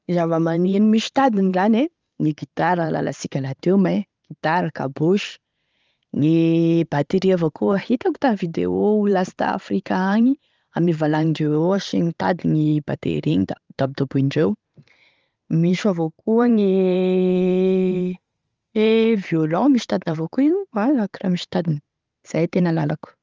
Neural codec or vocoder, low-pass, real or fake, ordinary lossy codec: vocoder, 44.1 kHz, 128 mel bands every 512 samples, BigVGAN v2; 7.2 kHz; fake; Opus, 32 kbps